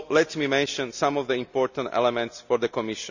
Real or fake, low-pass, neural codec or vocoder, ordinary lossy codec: real; 7.2 kHz; none; none